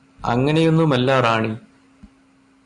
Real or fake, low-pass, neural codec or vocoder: real; 10.8 kHz; none